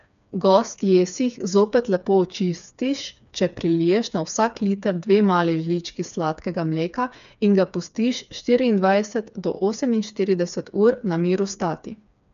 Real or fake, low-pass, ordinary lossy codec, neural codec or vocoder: fake; 7.2 kHz; none; codec, 16 kHz, 4 kbps, FreqCodec, smaller model